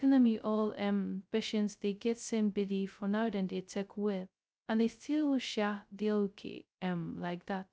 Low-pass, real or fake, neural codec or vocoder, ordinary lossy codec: none; fake; codec, 16 kHz, 0.2 kbps, FocalCodec; none